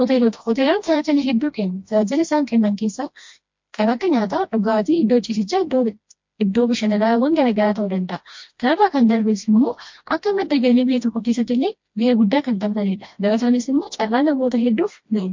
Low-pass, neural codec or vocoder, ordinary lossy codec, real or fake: 7.2 kHz; codec, 16 kHz, 1 kbps, FreqCodec, smaller model; MP3, 48 kbps; fake